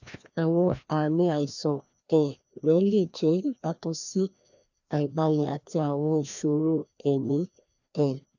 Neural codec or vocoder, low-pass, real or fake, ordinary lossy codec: codec, 16 kHz, 1 kbps, FreqCodec, larger model; 7.2 kHz; fake; none